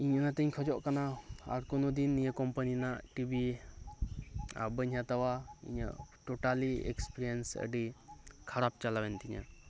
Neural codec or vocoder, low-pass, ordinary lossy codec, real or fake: none; none; none; real